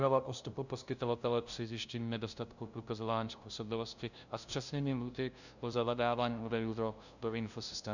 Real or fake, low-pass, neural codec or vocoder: fake; 7.2 kHz; codec, 16 kHz, 0.5 kbps, FunCodec, trained on LibriTTS, 25 frames a second